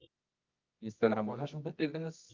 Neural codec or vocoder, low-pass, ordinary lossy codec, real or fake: codec, 24 kHz, 0.9 kbps, WavTokenizer, medium music audio release; 7.2 kHz; Opus, 24 kbps; fake